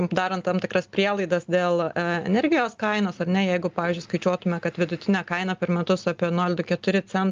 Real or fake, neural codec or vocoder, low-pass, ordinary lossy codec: real; none; 7.2 kHz; Opus, 32 kbps